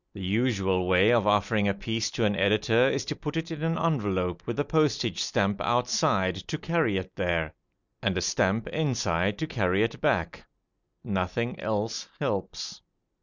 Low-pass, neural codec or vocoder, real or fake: 7.2 kHz; none; real